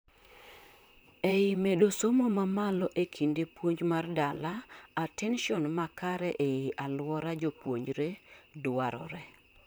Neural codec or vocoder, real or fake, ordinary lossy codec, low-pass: vocoder, 44.1 kHz, 128 mel bands, Pupu-Vocoder; fake; none; none